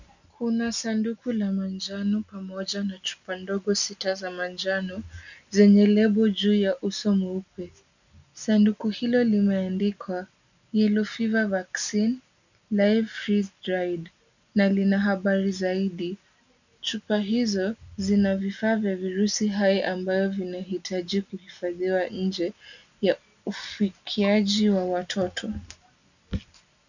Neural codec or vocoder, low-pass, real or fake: none; 7.2 kHz; real